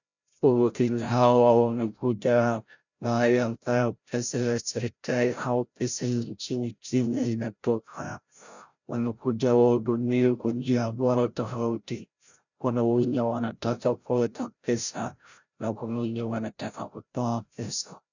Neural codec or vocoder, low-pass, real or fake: codec, 16 kHz, 0.5 kbps, FreqCodec, larger model; 7.2 kHz; fake